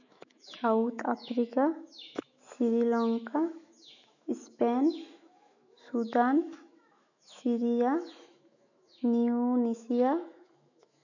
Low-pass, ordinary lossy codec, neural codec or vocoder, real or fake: 7.2 kHz; none; autoencoder, 48 kHz, 128 numbers a frame, DAC-VAE, trained on Japanese speech; fake